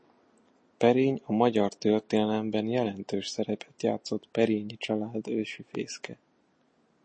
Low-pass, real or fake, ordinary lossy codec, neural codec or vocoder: 9.9 kHz; real; MP3, 32 kbps; none